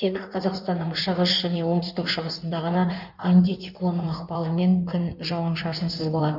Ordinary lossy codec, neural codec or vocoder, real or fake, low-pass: none; codec, 16 kHz in and 24 kHz out, 1.1 kbps, FireRedTTS-2 codec; fake; 5.4 kHz